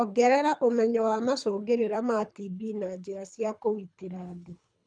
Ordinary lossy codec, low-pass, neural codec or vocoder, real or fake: none; 9.9 kHz; codec, 24 kHz, 3 kbps, HILCodec; fake